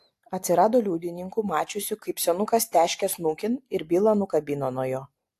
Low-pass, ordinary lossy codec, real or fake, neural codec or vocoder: 14.4 kHz; AAC, 64 kbps; real; none